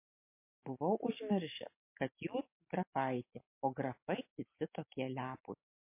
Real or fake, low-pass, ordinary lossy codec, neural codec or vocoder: real; 3.6 kHz; MP3, 24 kbps; none